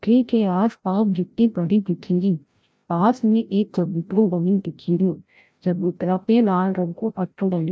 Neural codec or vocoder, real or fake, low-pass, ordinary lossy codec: codec, 16 kHz, 0.5 kbps, FreqCodec, larger model; fake; none; none